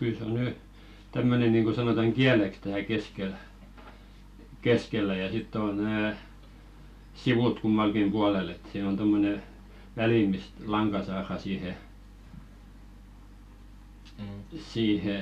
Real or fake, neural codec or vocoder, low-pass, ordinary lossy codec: real; none; 14.4 kHz; none